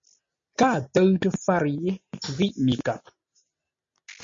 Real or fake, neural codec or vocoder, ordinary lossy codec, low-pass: real; none; MP3, 48 kbps; 7.2 kHz